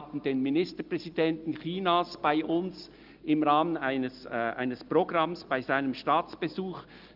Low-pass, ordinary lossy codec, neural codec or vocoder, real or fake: 5.4 kHz; Opus, 32 kbps; none; real